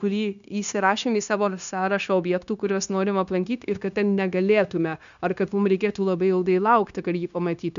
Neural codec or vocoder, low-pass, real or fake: codec, 16 kHz, 0.9 kbps, LongCat-Audio-Codec; 7.2 kHz; fake